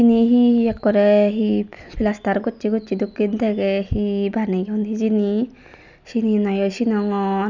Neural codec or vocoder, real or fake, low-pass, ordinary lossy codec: none; real; 7.2 kHz; none